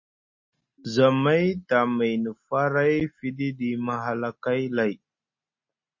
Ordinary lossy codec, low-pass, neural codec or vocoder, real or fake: MP3, 32 kbps; 7.2 kHz; none; real